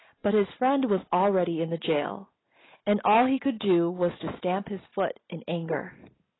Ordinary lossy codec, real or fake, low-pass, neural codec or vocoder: AAC, 16 kbps; real; 7.2 kHz; none